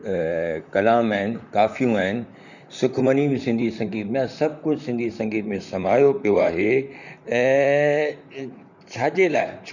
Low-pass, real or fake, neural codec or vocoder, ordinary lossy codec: 7.2 kHz; fake; vocoder, 44.1 kHz, 128 mel bands, Pupu-Vocoder; none